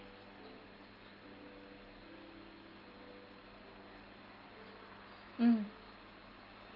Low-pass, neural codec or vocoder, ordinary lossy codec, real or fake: 5.4 kHz; none; Opus, 24 kbps; real